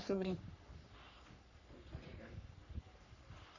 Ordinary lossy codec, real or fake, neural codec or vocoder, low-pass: none; fake; codec, 44.1 kHz, 3.4 kbps, Pupu-Codec; 7.2 kHz